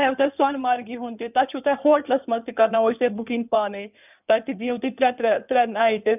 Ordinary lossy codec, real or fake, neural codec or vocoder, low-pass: none; fake; codec, 16 kHz in and 24 kHz out, 2.2 kbps, FireRedTTS-2 codec; 3.6 kHz